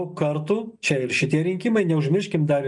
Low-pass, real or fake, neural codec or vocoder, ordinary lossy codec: 10.8 kHz; real; none; MP3, 96 kbps